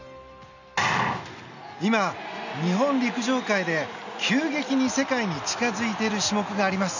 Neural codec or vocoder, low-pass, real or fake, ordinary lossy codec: none; 7.2 kHz; real; none